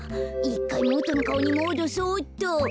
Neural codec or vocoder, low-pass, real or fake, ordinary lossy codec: none; none; real; none